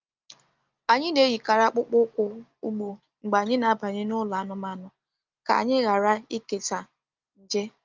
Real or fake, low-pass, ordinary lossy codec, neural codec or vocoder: real; 7.2 kHz; Opus, 32 kbps; none